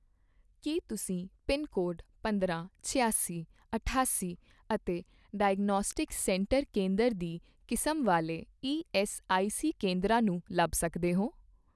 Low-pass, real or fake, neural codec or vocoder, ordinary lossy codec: none; real; none; none